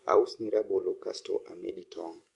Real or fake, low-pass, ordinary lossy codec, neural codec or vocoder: fake; 10.8 kHz; MP3, 64 kbps; codec, 44.1 kHz, 7.8 kbps, DAC